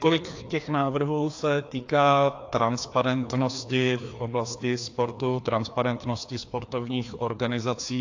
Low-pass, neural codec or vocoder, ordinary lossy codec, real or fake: 7.2 kHz; codec, 16 kHz, 2 kbps, FreqCodec, larger model; AAC, 48 kbps; fake